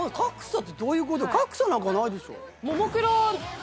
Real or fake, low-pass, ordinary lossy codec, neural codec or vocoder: real; none; none; none